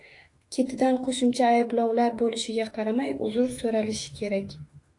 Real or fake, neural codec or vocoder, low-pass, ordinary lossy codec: fake; autoencoder, 48 kHz, 32 numbers a frame, DAC-VAE, trained on Japanese speech; 10.8 kHz; AAC, 48 kbps